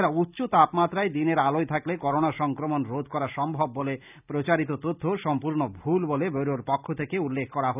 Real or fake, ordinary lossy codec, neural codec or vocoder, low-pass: real; none; none; 3.6 kHz